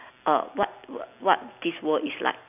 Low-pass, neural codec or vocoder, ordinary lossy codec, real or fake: 3.6 kHz; none; none; real